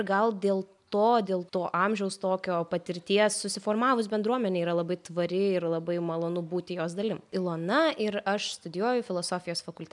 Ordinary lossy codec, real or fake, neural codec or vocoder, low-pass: MP3, 96 kbps; real; none; 10.8 kHz